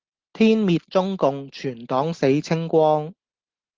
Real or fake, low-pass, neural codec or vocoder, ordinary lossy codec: real; 7.2 kHz; none; Opus, 16 kbps